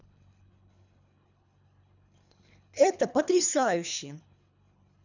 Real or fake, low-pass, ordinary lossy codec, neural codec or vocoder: fake; 7.2 kHz; none; codec, 24 kHz, 3 kbps, HILCodec